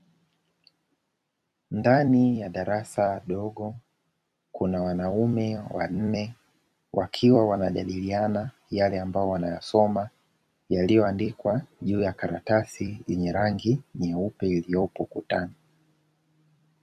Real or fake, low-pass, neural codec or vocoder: fake; 14.4 kHz; vocoder, 44.1 kHz, 128 mel bands every 256 samples, BigVGAN v2